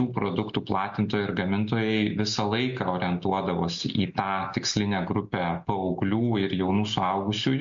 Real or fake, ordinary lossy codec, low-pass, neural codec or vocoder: real; MP3, 48 kbps; 7.2 kHz; none